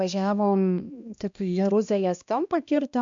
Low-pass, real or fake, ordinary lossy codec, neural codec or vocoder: 7.2 kHz; fake; MP3, 64 kbps; codec, 16 kHz, 1 kbps, X-Codec, HuBERT features, trained on balanced general audio